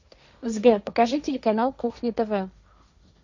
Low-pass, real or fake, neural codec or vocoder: 7.2 kHz; fake; codec, 16 kHz, 1.1 kbps, Voila-Tokenizer